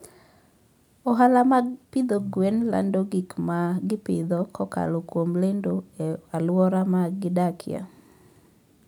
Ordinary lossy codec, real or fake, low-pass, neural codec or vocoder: none; real; 19.8 kHz; none